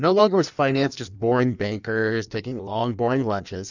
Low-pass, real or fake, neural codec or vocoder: 7.2 kHz; fake; codec, 16 kHz in and 24 kHz out, 1.1 kbps, FireRedTTS-2 codec